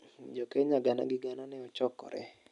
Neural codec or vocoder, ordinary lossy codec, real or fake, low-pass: none; none; real; 10.8 kHz